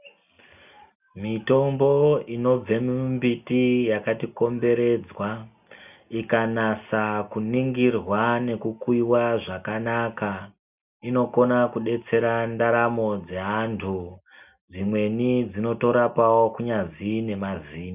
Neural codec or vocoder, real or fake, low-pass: none; real; 3.6 kHz